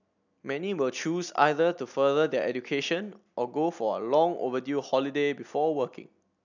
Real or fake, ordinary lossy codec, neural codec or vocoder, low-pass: real; none; none; 7.2 kHz